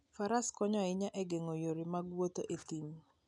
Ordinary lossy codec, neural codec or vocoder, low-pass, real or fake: none; none; 10.8 kHz; real